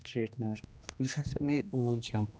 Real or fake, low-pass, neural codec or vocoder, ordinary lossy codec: fake; none; codec, 16 kHz, 1 kbps, X-Codec, HuBERT features, trained on general audio; none